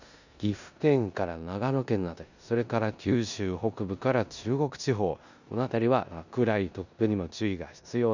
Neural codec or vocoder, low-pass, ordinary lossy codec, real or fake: codec, 16 kHz in and 24 kHz out, 0.9 kbps, LongCat-Audio-Codec, four codebook decoder; 7.2 kHz; none; fake